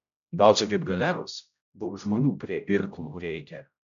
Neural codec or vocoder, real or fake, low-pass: codec, 16 kHz, 0.5 kbps, X-Codec, HuBERT features, trained on general audio; fake; 7.2 kHz